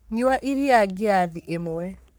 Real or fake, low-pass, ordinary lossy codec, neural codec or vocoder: fake; none; none; codec, 44.1 kHz, 3.4 kbps, Pupu-Codec